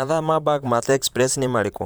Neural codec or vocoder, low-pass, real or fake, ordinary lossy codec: vocoder, 44.1 kHz, 128 mel bands, Pupu-Vocoder; none; fake; none